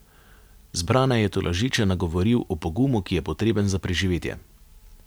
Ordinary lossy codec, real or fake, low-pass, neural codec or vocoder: none; real; none; none